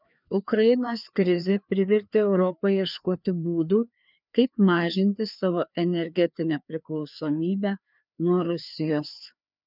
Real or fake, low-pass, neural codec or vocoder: fake; 5.4 kHz; codec, 16 kHz, 2 kbps, FreqCodec, larger model